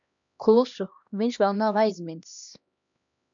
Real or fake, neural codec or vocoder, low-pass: fake; codec, 16 kHz, 1 kbps, X-Codec, HuBERT features, trained on LibriSpeech; 7.2 kHz